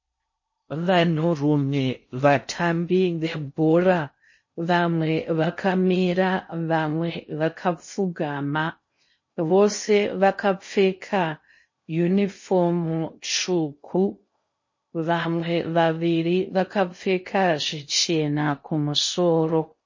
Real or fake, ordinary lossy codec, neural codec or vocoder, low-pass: fake; MP3, 32 kbps; codec, 16 kHz in and 24 kHz out, 0.6 kbps, FocalCodec, streaming, 4096 codes; 7.2 kHz